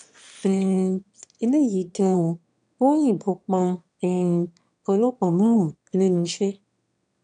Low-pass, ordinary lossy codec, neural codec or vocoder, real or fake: 9.9 kHz; none; autoencoder, 22.05 kHz, a latent of 192 numbers a frame, VITS, trained on one speaker; fake